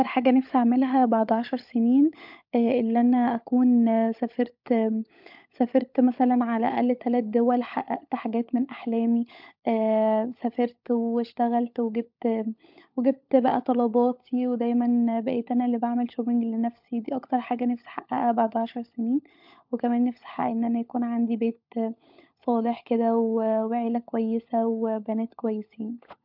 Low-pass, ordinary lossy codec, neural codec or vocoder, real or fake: 5.4 kHz; MP3, 48 kbps; codec, 16 kHz, 16 kbps, FunCodec, trained on LibriTTS, 50 frames a second; fake